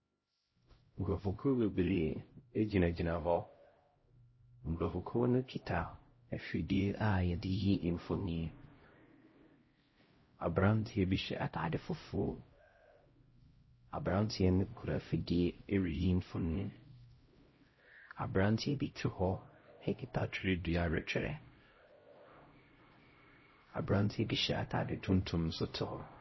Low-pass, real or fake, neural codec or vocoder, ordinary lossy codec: 7.2 kHz; fake; codec, 16 kHz, 0.5 kbps, X-Codec, HuBERT features, trained on LibriSpeech; MP3, 24 kbps